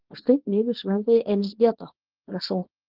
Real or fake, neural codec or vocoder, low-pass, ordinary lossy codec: fake; codec, 24 kHz, 0.9 kbps, WavTokenizer, small release; 5.4 kHz; Opus, 16 kbps